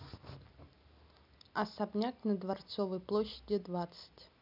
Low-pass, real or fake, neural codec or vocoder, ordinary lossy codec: 5.4 kHz; real; none; none